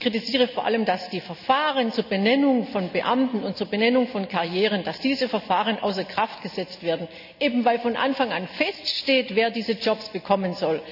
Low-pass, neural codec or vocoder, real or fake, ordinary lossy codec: 5.4 kHz; none; real; none